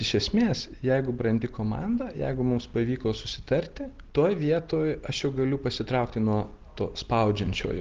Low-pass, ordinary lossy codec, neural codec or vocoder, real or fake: 7.2 kHz; Opus, 16 kbps; none; real